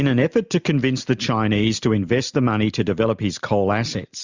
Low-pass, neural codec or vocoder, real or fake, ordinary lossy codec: 7.2 kHz; none; real; Opus, 64 kbps